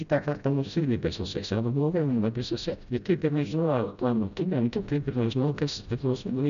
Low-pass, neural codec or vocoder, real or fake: 7.2 kHz; codec, 16 kHz, 0.5 kbps, FreqCodec, smaller model; fake